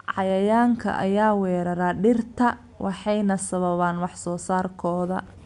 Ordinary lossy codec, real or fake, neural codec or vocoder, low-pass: none; real; none; 10.8 kHz